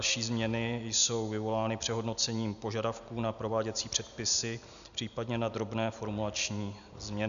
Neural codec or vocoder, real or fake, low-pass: none; real; 7.2 kHz